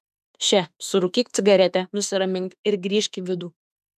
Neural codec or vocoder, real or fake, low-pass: autoencoder, 48 kHz, 32 numbers a frame, DAC-VAE, trained on Japanese speech; fake; 14.4 kHz